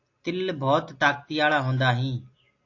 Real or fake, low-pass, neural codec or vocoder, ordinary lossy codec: real; 7.2 kHz; none; AAC, 48 kbps